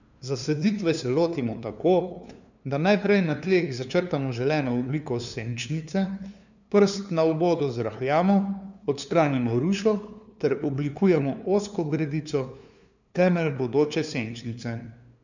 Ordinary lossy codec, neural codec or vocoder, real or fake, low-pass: none; codec, 16 kHz, 2 kbps, FunCodec, trained on LibriTTS, 25 frames a second; fake; 7.2 kHz